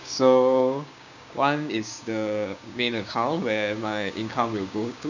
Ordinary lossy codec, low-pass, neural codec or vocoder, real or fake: none; 7.2 kHz; codec, 16 kHz, 6 kbps, DAC; fake